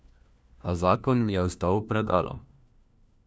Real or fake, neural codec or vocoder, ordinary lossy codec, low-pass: fake; codec, 16 kHz, 1 kbps, FunCodec, trained on LibriTTS, 50 frames a second; none; none